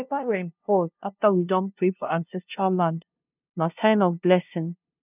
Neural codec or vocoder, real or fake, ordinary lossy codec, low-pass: codec, 16 kHz, 0.5 kbps, FunCodec, trained on LibriTTS, 25 frames a second; fake; none; 3.6 kHz